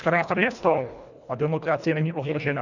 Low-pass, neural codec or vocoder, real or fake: 7.2 kHz; codec, 24 kHz, 1.5 kbps, HILCodec; fake